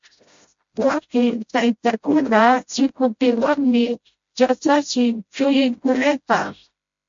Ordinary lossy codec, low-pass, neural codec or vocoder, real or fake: MP3, 48 kbps; 7.2 kHz; codec, 16 kHz, 0.5 kbps, FreqCodec, smaller model; fake